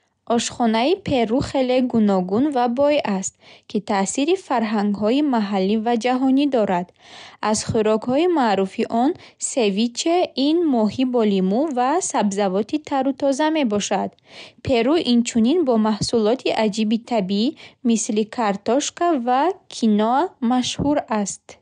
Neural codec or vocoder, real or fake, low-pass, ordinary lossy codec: none; real; 9.9 kHz; none